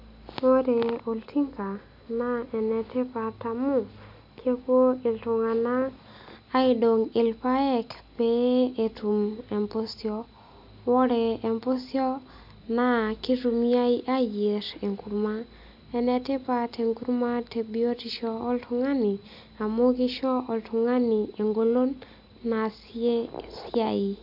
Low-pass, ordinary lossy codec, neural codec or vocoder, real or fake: 5.4 kHz; MP3, 48 kbps; none; real